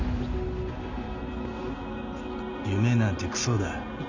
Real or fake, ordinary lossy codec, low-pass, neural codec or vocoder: real; none; 7.2 kHz; none